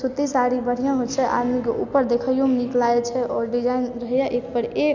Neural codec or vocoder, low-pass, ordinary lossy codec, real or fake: none; 7.2 kHz; none; real